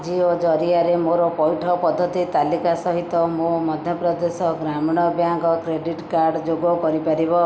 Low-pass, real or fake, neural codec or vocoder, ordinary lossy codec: none; real; none; none